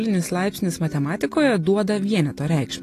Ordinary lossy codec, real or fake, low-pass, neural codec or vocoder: AAC, 48 kbps; real; 14.4 kHz; none